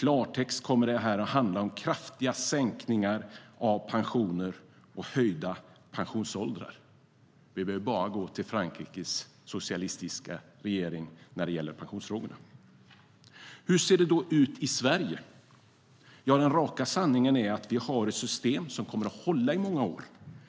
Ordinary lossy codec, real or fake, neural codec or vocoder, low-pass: none; real; none; none